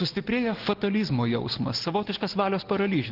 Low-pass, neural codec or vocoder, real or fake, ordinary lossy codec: 5.4 kHz; none; real; Opus, 16 kbps